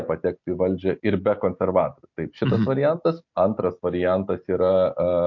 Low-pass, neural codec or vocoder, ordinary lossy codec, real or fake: 7.2 kHz; none; MP3, 48 kbps; real